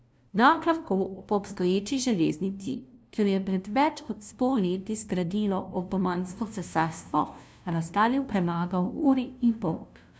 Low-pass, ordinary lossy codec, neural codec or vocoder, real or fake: none; none; codec, 16 kHz, 0.5 kbps, FunCodec, trained on LibriTTS, 25 frames a second; fake